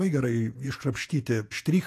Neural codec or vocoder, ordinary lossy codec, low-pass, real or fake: vocoder, 48 kHz, 128 mel bands, Vocos; AAC, 64 kbps; 14.4 kHz; fake